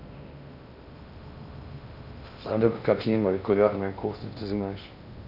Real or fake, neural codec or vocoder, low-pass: fake; codec, 16 kHz in and 24 kHz out, 0.6 kbps, FocalCodec, streaming, 2048 codes; 5.4 kHz